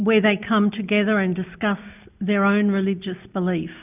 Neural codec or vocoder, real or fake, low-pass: none; real; 3.6 kHz